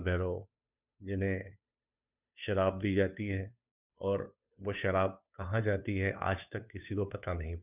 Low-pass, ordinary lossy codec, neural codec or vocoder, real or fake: 3.6 kHz; none; codec, 16 kHz, 2 kbps, FunCodec, trained on Chinese and English, 25 frames a second; fake